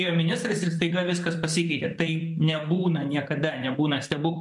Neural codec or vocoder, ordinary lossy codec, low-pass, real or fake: vocoder, 44.1 kHz, 128 mel bands, Pupu-Vocoder; MP3, 64 kbps; 10.8 kHz; fake